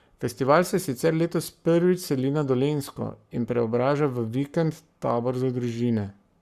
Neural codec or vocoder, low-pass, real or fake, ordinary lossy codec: codec, 44.1 kHz, 7.8 kbps, Pupu-Codec; 14.4 kHz; fake; Opus, 64 kbps